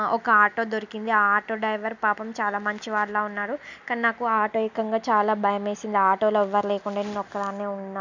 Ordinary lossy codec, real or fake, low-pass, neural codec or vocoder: none; real; 7.2 kHz; none